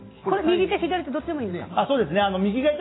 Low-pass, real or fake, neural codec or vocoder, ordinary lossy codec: 7.2 kHz; real; none; AAC, 16 kbps